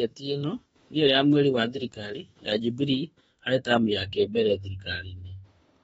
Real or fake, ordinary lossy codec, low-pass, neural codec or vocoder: fake; AAC, 24 kbps; 19.8 kHz; autoencoder, 48 kHz, 32 numbers a frame, DAC-VAE, trained on Japanese speech